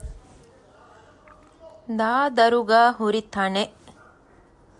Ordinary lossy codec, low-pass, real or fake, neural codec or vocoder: MP3, 96 kbps; 10.8 kHz; real; none